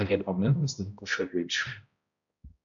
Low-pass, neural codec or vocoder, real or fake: 7.2 kHz; codec, 16 kHz, 0.5 kbps, X-Codec, HuBERT features, trained on balanced general audio; fake